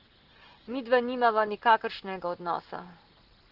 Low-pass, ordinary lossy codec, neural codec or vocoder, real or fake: 5.4 kHz; Opus, 16 kbps; none; real